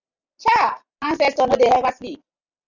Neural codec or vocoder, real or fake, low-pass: none; real; 7.2 kHz